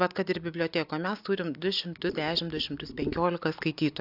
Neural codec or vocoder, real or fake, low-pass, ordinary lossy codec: none; real; 5.4 kHz; AAC, 48 kbps